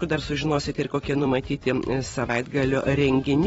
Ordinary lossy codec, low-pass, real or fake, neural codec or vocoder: AAC, 24 kbps; 19.8 kHz; fake; vocoder, 44.1 kHz, 128 mel bands every 256 samples, BigVGAN v2